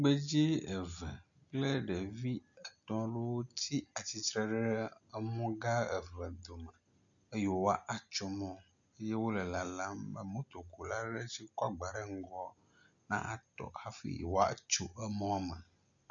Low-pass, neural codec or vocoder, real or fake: 7.2 kHz; none; real